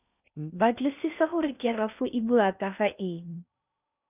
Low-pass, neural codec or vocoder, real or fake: 3.6 kHz; codec, 16 kHz in and 24 kHz out, 0.6 kbps, FocalCodec, streaming, 4096 codes; fake